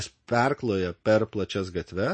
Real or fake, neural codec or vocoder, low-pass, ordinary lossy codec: real; none; 9.9 kHz; MP3, 32 kbps